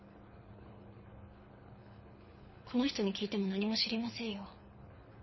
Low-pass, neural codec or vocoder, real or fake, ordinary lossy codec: 7.2 kHz; codec, 24 kHz, 6 kbps, HILCodec; fake; MP3, 24 kbps